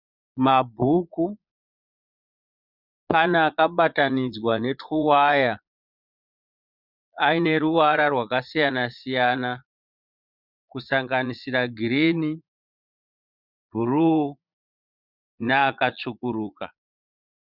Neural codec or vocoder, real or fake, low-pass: vocoder, 22.05 kHz, 80 mel bands, Vocos; fake; 5.4 kHz